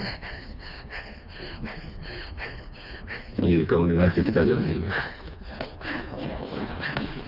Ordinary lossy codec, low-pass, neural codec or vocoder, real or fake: none; 5.4 kHz; codec, 16 kHz, 2 kbps, FreqCodec, smaller model; fake